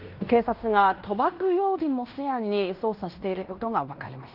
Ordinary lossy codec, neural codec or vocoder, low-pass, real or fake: Opus, 24 kbps; codec, 16 kHz in and 24 kHz out, 0.9 kbps, LongCat-Audio-Codec, fine tuned four codebook decoder; 5.4 kHz; fake